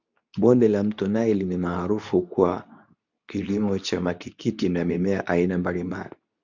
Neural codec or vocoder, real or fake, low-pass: codec, 24 kHz, 0.9 kbps, WavTokenizer, medium speech release version 2; fake; 7.2 kHz